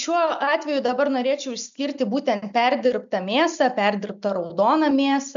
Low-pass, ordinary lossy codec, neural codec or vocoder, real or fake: 7.2 kHz; AAC, 96 kbps; none; real